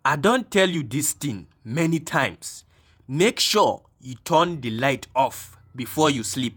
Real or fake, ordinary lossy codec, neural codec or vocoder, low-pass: fake; none; vocoder, 48 kHz, 128 mel bands, Vocos; none